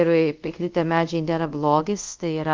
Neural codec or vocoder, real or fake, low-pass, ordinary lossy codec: codec, 24 kHz, 0.5 kbps, DualCodec; fake; 7.2 kHz; Opus, 32 kbps